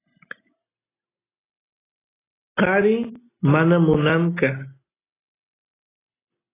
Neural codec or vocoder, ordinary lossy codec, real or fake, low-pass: none; AAC, 16 kbps; real; 3.6 kHz